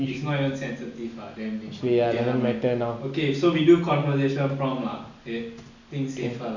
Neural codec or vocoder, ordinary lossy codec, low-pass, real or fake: none; none; 7.2 kHz; real